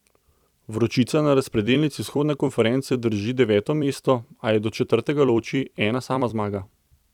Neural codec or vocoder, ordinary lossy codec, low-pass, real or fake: vocoder, 44.1 kHz, 128 mel bands every 256 samples, BigVGAN v2; none; 19.8 kHz; fake